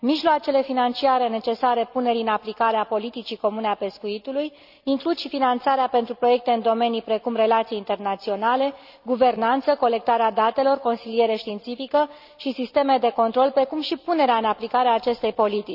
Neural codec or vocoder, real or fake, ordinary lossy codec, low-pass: none; real; none; 5.4 kHz